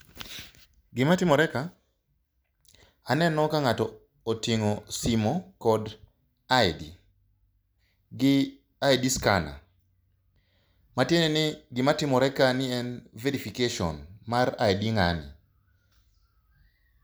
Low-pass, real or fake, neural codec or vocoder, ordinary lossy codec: none; real; none; none